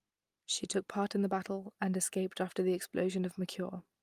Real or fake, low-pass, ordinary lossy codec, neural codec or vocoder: real; 14.4 kHz; Opus, 32 kbps; none